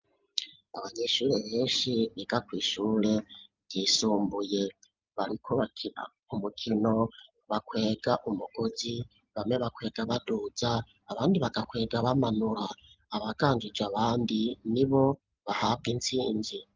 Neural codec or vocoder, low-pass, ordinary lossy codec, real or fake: none; 7.2 kHz; Opus, 24 kbps; real